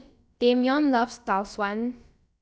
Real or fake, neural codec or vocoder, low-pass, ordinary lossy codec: fake; codec, 16 kHz, about 1 kbps, DyCAST, with the encoder's durations; none; none